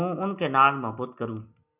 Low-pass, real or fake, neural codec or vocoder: 3.6 kHz; real; none